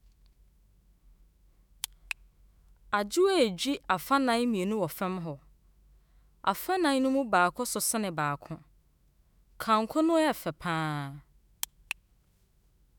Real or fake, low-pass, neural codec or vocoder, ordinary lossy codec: fake; none; autoencoder, 48 kHz, 128 numbers a frame, DAC-VAE, trained on Japanese speech; none